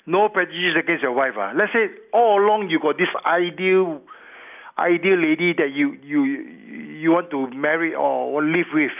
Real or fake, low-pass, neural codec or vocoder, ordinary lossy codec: real; 3.6 kHz; none; none